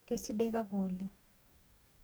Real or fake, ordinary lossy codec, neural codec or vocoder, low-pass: fake; none; codec, 44.1 kHz, 2.6 kbps, DAC; none